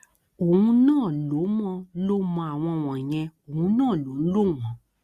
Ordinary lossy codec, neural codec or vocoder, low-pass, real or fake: Opus, 64 kbps; none; 14.4 kHz; real